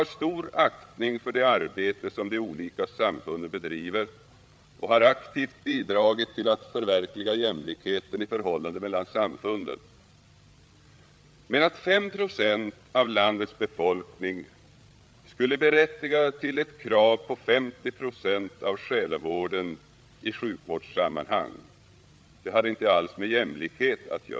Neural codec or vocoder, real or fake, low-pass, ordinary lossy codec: codec, 16 kHz, 8 kbps, FreqCodec, larger model; fake; none; none